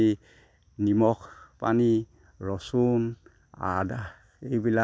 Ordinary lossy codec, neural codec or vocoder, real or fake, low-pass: none; none; real; none